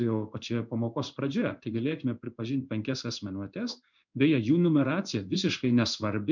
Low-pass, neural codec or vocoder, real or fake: 7.2 kHz; codec, 16 kHz in and 24 kHz out, 1 kbps, XY-Tokenizer; fake